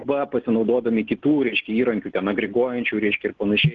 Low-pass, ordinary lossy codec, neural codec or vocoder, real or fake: 7.2 kHz; Opus, 16 kbps; none; real